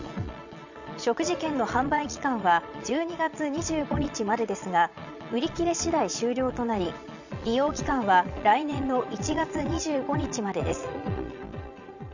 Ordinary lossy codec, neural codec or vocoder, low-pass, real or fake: none; vocoder, 22.05 kHz, 80 mel bands, Vocos; 7.2 kHz; fake